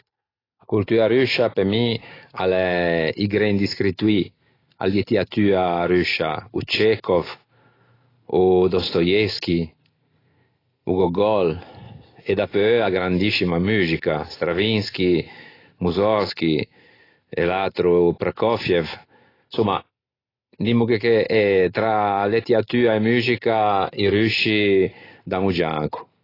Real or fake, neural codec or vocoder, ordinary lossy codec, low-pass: real; none; AAC, 24 kbps; 5.4 kHz